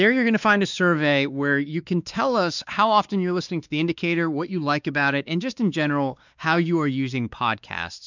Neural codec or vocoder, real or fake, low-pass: codec, 16 kHz, 2 kbps, X-Codec, WavLM features, trained on Multilingual LibriSpeech; fake; 7.2 kHz